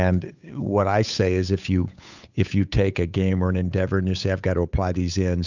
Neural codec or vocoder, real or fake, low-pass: codec, 16 kHz, 8 kbps, FunCodec, trained on Chinese and English, 25 frames a second; fake; 7.2 kHz